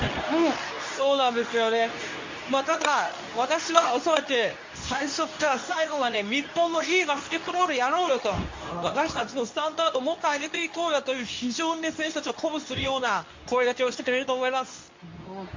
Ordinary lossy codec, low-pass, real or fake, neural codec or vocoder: MP3, 48 kbps; 7.2 kHz; fake; codec, 24 kHz, 0.9 kbps, WavTokenizer, medium speech release version 2